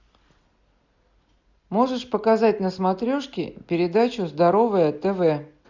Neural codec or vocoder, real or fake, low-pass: none; real; 7.2 kHz